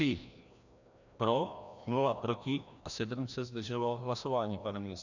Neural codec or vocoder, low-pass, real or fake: codec, 16 kHz, 1 kbps, FreqCodec, larger model; 7.2 kHz; fake